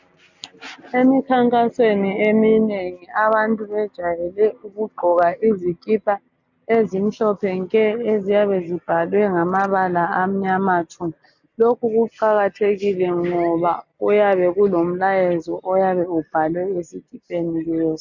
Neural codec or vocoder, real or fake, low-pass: none; real; 7.2 kHz